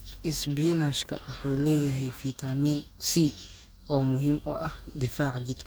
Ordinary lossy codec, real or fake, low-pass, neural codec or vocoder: none; fake; none; codec, 44.1 kHz, 2.6 kbps, DAC